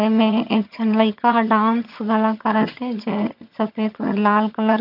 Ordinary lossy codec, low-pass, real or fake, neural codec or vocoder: none; 5.4 kHz; fake; vocoder, 22.05 kHz, 80 mel bands, HiFi-GAN